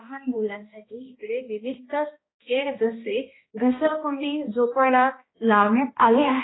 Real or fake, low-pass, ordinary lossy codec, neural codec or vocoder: fake; 7.2 kHz; AAC, 16 kbps; codec, 16 kHz, 1 kbps, X-Codec, HuBERT features, trained on balanced general audio